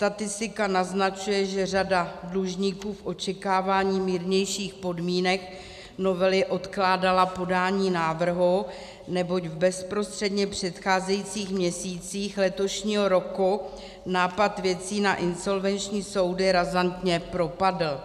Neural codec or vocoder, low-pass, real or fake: none; 14.4 kHz; real